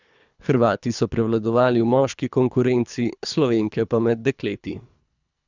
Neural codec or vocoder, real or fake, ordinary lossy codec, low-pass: codec, 24 kHz, 3 kbps, HILCodec; fake; none; 7.2 kHz